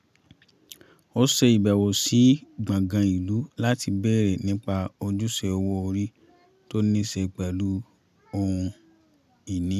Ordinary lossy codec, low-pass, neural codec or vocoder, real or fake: none; 14.4 kHz; none; real